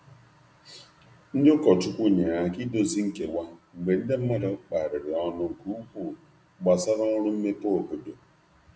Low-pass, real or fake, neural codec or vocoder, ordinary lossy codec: none; real; none; none